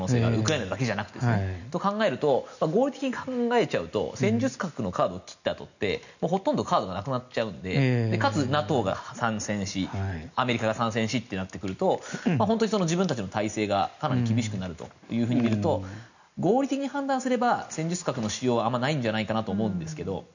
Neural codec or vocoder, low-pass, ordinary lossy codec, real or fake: none; 7.2 kHz; none; real